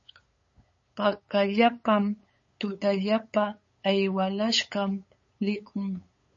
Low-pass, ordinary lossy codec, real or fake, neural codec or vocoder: 7.2 kHz; MP3, 32 kbps; fake; codec, 16 kHz, 8 kbps, FunCodec, trained on LibriTTS, 25 frames a second